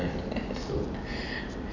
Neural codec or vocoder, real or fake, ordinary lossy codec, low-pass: none; real; none; 7.2 kHz